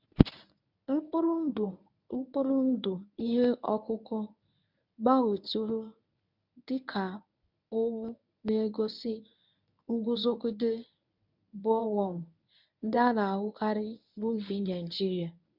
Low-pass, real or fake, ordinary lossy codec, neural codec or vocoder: 5.4 kHz; fake; none; codec, 24 kHz, 0.9 kbps, WavTokenizer, medium speech release version 1